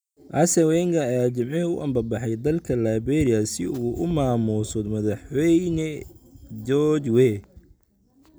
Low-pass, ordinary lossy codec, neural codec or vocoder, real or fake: none; none; none; real